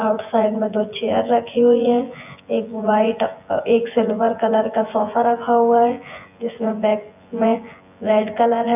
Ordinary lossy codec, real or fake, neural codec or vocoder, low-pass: none; fake; vocoder, 24 kHz, 100 mel bands, Vocos; 3.6 kHz